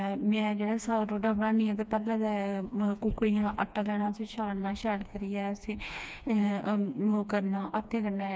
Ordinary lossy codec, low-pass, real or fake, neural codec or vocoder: none; none; fake; codec, 16 kHz, 2 kbps, FreqCodec, smaller model